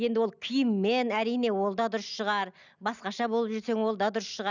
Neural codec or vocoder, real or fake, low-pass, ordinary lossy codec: none; real; 7.2 kHz; none